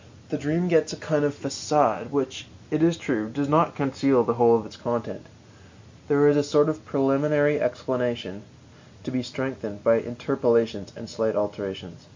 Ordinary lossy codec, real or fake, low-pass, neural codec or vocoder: MP3, 48 kbps; real; 7.2 kHz; none